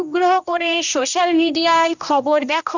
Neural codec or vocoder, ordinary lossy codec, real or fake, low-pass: codec, 16 kHz, 1 kbps, X-Codec, HuBERT features, trained on general audio; none; fake; 7.2 kHz